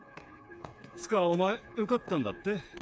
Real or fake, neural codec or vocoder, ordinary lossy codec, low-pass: fake; codec, 16 kHz, 4 kbps, FreqCodec, smaller model; none; none